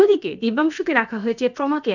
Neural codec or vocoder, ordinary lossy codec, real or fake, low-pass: codec, 16 kHz, about 1 kbps, DyCAST, with the encoder's durations; none; fake; 7.2 kHz